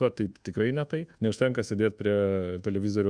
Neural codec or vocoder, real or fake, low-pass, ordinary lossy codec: codec, 24 kHz, 1.2 kbps, DualCodec; fake; 9.9 kHz; Opus, 64 kbps